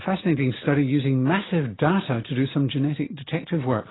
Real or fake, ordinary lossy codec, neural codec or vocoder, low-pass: real; AAC, 16 kbps; none; 7.2 kHz